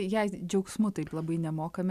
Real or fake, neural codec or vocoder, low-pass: real; none; 14.4 kHz